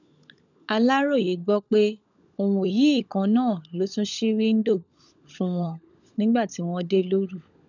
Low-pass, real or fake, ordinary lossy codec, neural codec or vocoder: 7.2 kHz; fake; none; codec, 16 kHz, 16 kbps, FunCodec, trained on LibriTTS, 50 frames a second